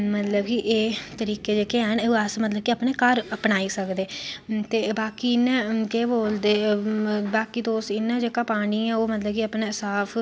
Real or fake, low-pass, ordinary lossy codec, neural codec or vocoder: real; none; none; none